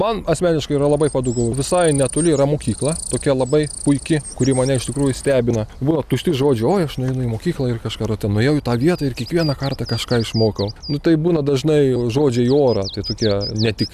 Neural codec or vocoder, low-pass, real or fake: none; 14.4 kHz; real